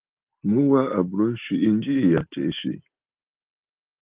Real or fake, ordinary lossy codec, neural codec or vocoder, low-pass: real; Opus, 32 kbps; none; 3.6 kHz